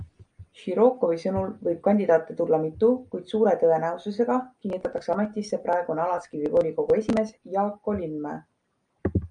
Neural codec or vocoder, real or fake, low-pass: none; real; 9.9 kHz